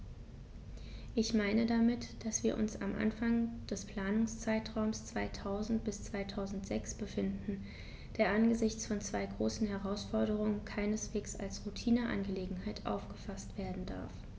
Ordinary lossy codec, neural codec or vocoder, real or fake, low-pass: none; none; real; none